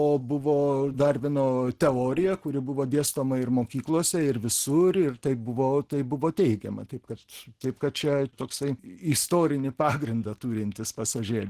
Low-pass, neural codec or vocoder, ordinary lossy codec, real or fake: 14.4 kHz; none; Opus, 16 kbps; real